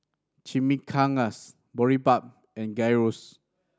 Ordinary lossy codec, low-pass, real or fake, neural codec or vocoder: none; none; real; none